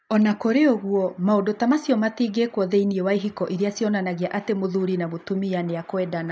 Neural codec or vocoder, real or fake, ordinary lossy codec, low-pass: none; real; none; none